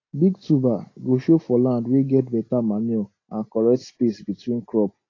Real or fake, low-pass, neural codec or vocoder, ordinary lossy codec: real; 7.2 kHz; none; AAC, 32 kbps